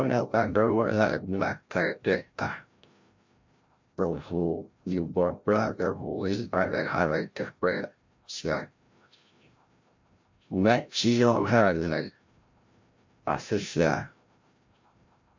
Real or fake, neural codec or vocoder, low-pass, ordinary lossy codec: fake; codec, 16 kHz, 0.5 kbps, FreqCodec, larger model; 7.2 kHz; MP3, 48 kbps